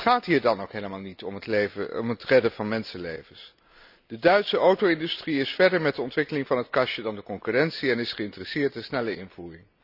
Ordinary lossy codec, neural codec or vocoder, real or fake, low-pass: none; none; real; 5.4 kHz